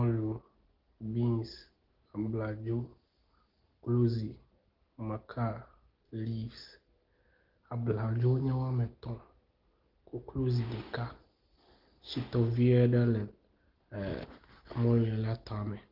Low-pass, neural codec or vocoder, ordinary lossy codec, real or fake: 5.4 kHz; none; Opus, 16 kbps; real